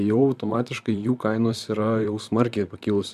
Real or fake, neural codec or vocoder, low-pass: fake; vocoder, 44.1 kHz, 128 mel bands, Pupu-Vocoder; 14.4 kHz